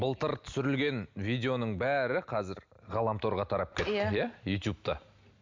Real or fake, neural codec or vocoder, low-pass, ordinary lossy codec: real; none; 7.2 kHz; MP3, 64 kbps